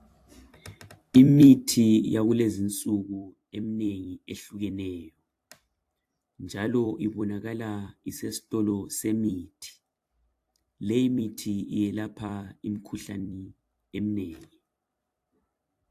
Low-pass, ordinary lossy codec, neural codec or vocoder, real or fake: 14.4 kHz; AAC, 64 kbps; vocoder, 44.1 kHz, 128 mel bands every 256 samples, BigVGAN v2; fake